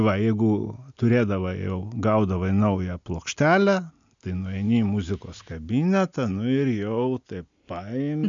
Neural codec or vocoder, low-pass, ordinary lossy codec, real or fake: none; 7.2 kHz; MP3, 64 kbps; real